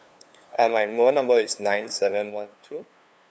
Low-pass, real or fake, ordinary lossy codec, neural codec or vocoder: none; fake; none; codec, 16 kHz, 2 kbps, FunCodec, trained on LibriTTS, 25 frames a second